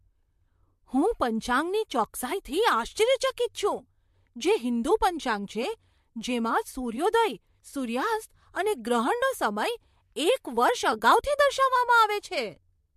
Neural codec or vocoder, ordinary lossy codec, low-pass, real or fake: vocoder, 44.1 kHz, 128 mel bands, Pupu-Vocoder; MP3, 64 kbps; 14.4 kHz; fake